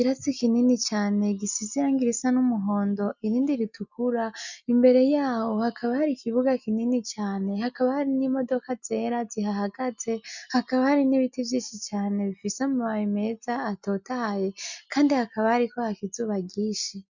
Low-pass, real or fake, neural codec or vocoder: 7.2 kHz; real; none